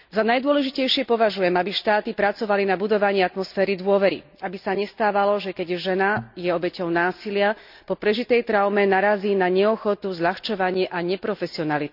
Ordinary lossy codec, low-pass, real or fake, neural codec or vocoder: none; 5.4 kHz; real; none